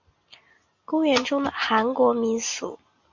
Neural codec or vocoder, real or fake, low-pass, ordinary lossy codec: none; real; 7.2 kHz; MP3, 48 kbps